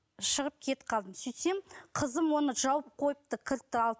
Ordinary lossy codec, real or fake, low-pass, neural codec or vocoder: none; real; none; none